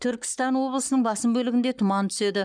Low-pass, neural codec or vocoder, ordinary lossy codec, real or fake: 9.9 kHz; codec, 44.1 kHz, 7.8 kbps, Pupu-Codec; none; fake